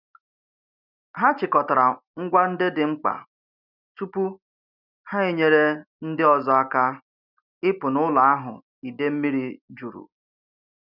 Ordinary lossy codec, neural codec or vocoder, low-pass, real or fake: none; none; 5.4 kHz; real